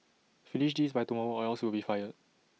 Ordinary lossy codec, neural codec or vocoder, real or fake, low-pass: none; none; real; none